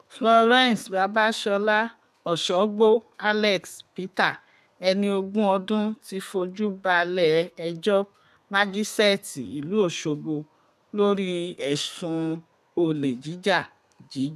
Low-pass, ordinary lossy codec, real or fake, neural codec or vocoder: 14.4 kHz; none; fake; codec, 32 kHz, 1.9 kbps, SNAC